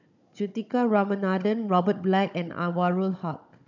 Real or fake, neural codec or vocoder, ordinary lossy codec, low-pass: fake; codec, 16 kHz, 16 kbps, FunCodec, trained on Chinese and English, 50 frames a second; none; 7.2 kHz